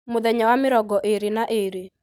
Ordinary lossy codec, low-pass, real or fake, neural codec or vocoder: none; none; real; none